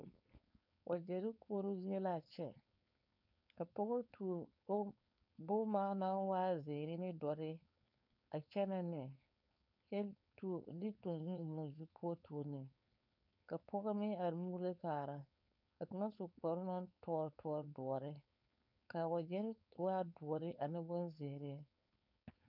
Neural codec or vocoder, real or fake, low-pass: codec, 16 kHz, 4.8 kbps, FACodec; fake; 5.4 kHz